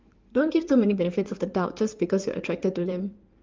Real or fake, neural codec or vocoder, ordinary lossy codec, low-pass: fake; codec, 16 kHz in and 24 kHz out, 2.2 kbps, FireRedTTS-2 codec; Opus, 24 kbps; 7.2 kHz